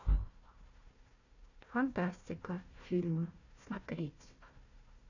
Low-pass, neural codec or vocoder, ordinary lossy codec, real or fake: 7.2 kHz; codec, 16 kHz, 1 kbps, FunCodec, trained on Chinese and English, 50 frames a second; none; fake